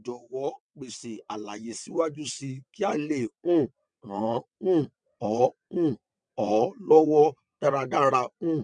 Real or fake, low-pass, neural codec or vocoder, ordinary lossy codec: fake; 9.9 kHz; vocoder, 22.05 kHz, 80 mel bands, WaveNeXt; none